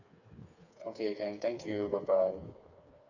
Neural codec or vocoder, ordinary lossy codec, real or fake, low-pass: codec, 16 kHz, 4 kbps, FreqCodec, smaller model; none; fake; 7.2 kHz